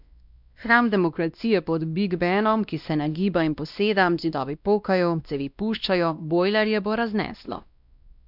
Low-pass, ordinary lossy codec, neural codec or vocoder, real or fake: 5.4 kHz; none; codec, 16 kHz, 1 kbps, X-Codec, WavLM features, trained on Multilingual LibriSpeech; fake